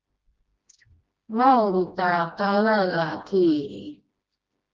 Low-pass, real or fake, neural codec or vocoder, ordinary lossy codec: 7.2 kHz; fake; codec, 16 kHz, 1 kbps, FreqCodec, smaller model; Opus, 24 kbps